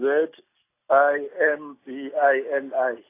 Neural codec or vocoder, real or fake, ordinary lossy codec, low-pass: none; real; AAC, 24 kbps; 3.6 kHz